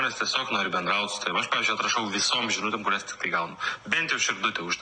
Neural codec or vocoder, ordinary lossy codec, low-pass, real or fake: none; Opus, 64 kbps; 9.9 kHz; real